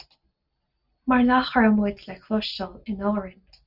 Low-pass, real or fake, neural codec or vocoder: 5.4 kHz; real; none